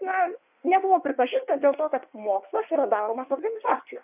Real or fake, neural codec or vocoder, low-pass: fake; codec, 16 kHz in and 24 kHz out, 1.1 kbps, FireRedTTS-2 codec; 3.6 kHz